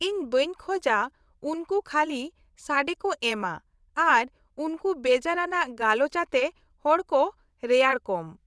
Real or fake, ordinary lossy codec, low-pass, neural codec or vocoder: fake; none; none; vocoder, 22.05 kHz, 80 mel bands, WaveNeXt